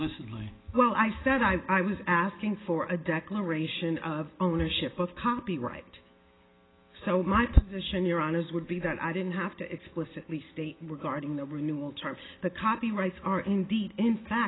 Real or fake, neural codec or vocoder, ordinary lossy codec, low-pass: real; none; AAC, 16 kbps; 7.2 kHz